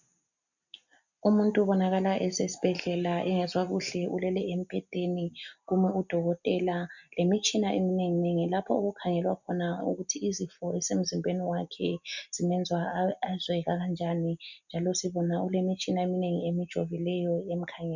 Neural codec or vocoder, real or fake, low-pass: none; real; 7.2 kHz